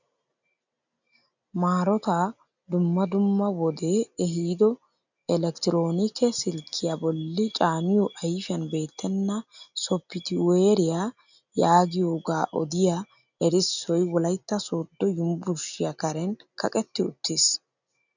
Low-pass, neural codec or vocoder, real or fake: 7.2 kHz; none; real